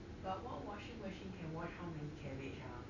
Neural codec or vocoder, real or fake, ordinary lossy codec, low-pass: none; real; none; 7.2 kHz